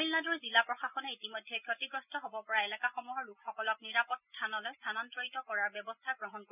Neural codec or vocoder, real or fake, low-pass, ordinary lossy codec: none; real; 3.6 kHz; none